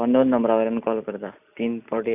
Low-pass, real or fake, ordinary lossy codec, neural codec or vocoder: 3.6 kHz; real; none; none